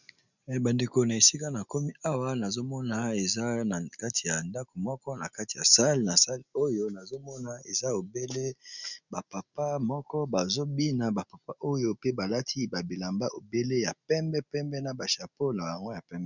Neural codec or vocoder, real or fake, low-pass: none; real; 7.2 kHz